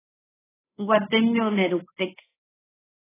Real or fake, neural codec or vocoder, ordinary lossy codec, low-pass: fake; codec, 24 kHz, 1.2 kbps, DualCodec; AAC, 16 kbps; 3.6 kHz